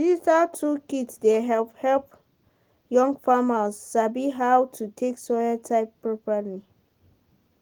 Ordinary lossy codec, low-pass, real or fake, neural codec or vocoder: Opus, 24 kbps; 19.8 kHz; real; none